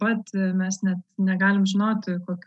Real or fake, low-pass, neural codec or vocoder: real; 10.8 kHz; none